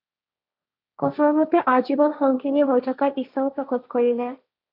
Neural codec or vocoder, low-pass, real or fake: codec, 16 kHz, 1.1 kbps, Voila-Tokenizer; 5.4 kHz; fake